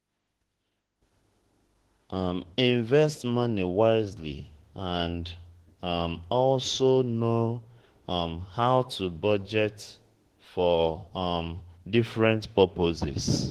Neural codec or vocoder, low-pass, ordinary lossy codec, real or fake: autoencoder, 48 kHz, 32 numbers a frame, DAC-VAE, trained on Japanese speech; 14.4 kHz; Opus, 16 kbps; fake